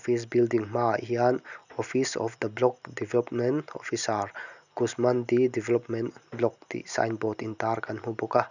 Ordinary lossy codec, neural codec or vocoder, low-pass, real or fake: none; none; 7.2 kHz; real